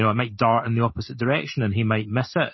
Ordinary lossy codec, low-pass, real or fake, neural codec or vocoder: MP3, 24 kbps; 7.2 kHz; real; none